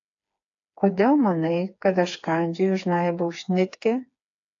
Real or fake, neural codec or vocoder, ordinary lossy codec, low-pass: fake; codec, 16 kHz, 4 kbps, FreqCodec, smaller model; AAC, 48 kbps; 7.2 kHz